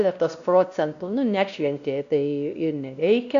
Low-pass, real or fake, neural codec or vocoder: 7.2 kHz; fake; codec, 16 kHz, 1 kbps, X-Codec, WavLM features, trained on Multilingual LibriSpeech